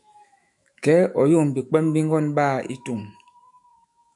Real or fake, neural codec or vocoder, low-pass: fake; autoencoder, 48 kHz, 128 numbers a frame, DAC-VAE, trained on Japanese speech; 10.8 kHz